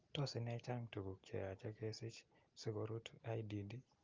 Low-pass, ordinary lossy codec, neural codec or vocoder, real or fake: 7.2 kHz; Opus, 24 kbps; none; real